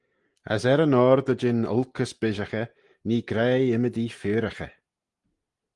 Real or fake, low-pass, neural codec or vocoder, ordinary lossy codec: real; 10.8 kHz; none; Opus, 24 kbps